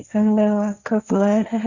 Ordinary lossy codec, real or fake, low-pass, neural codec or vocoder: none; fake; 7.2 kHz; codec, 16 kHz, 1.1 kbps, Voila-Tokenizer